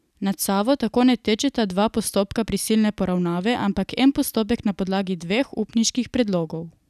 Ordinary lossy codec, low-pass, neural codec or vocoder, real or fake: none; 14.4 kHz; none; real